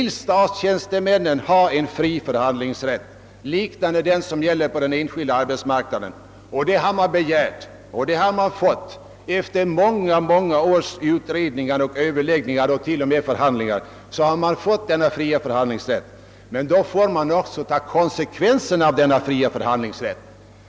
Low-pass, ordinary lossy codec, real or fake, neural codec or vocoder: none; none; real; none